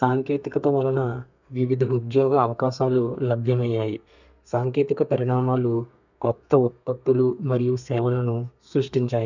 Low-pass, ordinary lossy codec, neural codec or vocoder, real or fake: 7.2 kHz; none; codec, 32 kHz, 1.9 kbps, SNAC; fake